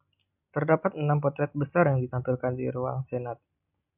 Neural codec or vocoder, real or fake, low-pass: none; real; 3.6 kHz